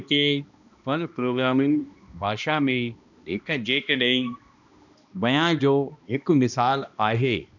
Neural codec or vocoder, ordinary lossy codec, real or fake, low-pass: codec, 16 kHz, 1 kbps, X-Codec, HuBERT features, trained on balanced general audio; none; fake; 7.2 kHz